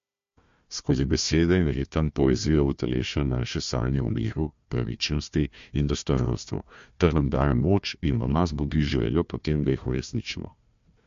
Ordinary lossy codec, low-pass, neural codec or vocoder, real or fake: MP3, 48 kbps; 7.2 kHz; codec, 16 kHz, 1 kbps, FunCodec, trained on Chinese and English, 50 frames a second; fake